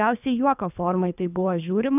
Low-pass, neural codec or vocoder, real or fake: 3.6 kHz; codec, 24 kHz, 3 kbps, HILCodec; fake